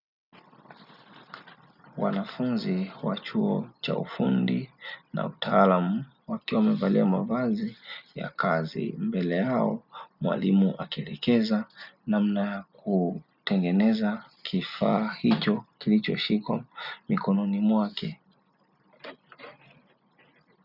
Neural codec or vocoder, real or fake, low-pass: none; real; 5.4 kHz